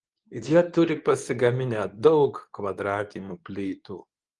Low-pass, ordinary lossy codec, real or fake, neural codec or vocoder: 10.8 kHz; Opus, 24 kbps; fake; codec, 24 kHz, 0.9 kbps, WavTokenizer, medium speech release version 2